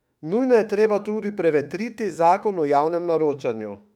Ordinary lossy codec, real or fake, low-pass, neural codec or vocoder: none; fake; 19.8 kHz; autoencoder, 48 kHz, 32 numbers a frame, DAC-VAE, trained on Japanese speech